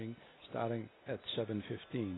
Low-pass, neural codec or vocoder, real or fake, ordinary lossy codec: 7.2 kHz; none; real; AAC, 16 kbps